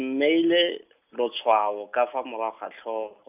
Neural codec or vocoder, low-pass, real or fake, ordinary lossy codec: none; 3.6 kHz; real; none